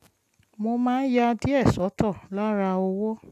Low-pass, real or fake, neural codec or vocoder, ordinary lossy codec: 14.4 kHz; real; none; AAC, 96 kbps